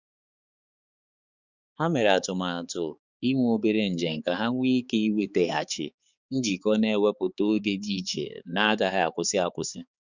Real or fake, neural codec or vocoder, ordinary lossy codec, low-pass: fake; codec, 16 kHz, 4 kbps, X-Codec, HuBERT features, trained on balanced general audio; Opus, 64 kbps; 7.2 kHz